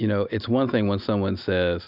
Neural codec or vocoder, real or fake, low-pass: none; real; 5.4 kHz